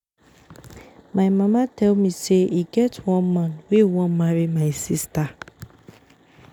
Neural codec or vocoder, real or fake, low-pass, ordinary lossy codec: none; real; 19.8 kHz; none